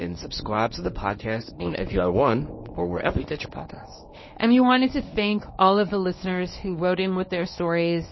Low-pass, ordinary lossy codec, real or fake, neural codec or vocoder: 7.2 kHz; MP3, 24 kbps; fake; codec, 24 kHz, 0.9 kbps, WavTokenizer, medium speech release version 1